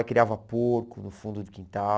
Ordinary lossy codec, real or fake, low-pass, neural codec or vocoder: none; real; none; none